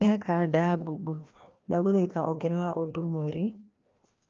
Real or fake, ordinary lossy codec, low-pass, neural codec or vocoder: fake; Opus, 24 kbps; 7.2 kHz; codec, 16 kHz, 1 kbps, FreqCodec, larger model